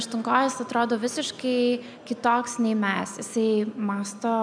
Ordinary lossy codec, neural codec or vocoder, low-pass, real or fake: MP3, 96 kbps; none; 9.9 kHz; real